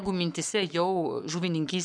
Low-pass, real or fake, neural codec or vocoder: 9.9 kHz; fake; codec, 44.1 kHz, 7.8 kbps, Pupu-Codec